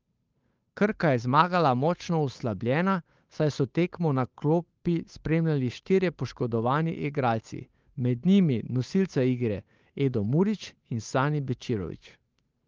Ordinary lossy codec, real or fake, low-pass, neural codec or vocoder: Opus, 24 kbps; fake; 7.2 kHz; codec, 16 kHz, 8 kbps, FunCodec, trained on Chinese and English, 25 frames a second